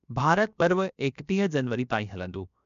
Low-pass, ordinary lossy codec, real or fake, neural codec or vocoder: 7.2 kHz; MP3, 96 kbps; fake; codec, 16 kHz, 0.7 kbps, FocalCodec